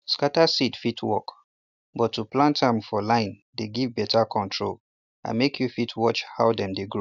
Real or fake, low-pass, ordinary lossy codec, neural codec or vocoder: real; 7.2 kHz; none; none